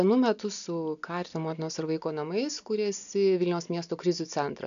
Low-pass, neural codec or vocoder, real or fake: 7.2 kHz; none; real